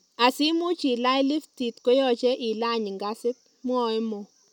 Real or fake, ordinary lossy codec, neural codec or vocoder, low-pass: real; none; none; 19.8 kHz